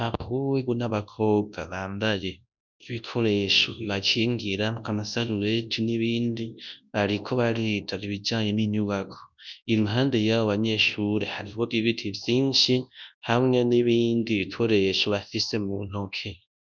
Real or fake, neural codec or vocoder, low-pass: fake; codec, 24 kHz, 0.9 kbps, WavTokenizer, large speech release; 7.2 kHz